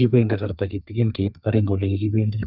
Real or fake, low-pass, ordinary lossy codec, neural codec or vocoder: fake; 5.4 kHz; none; codec, 32 kHz, 1.9 kbps, SNAC